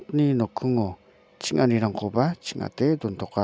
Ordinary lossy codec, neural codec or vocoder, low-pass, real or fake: none; none; none; real